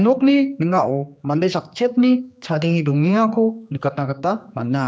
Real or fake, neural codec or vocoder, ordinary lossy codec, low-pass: fake; codec, 16 kHz, 2 kbps, X-Codec, HuBERT features, trained on general audio; none; none